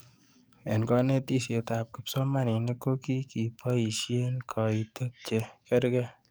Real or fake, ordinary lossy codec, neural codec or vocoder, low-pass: fake; none; codec, 44.1 kHz, 7.8 kbps, DAC; none